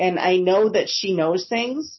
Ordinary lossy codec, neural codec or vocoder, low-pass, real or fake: MP3, 24 kbps; none; 7.2 kHz; real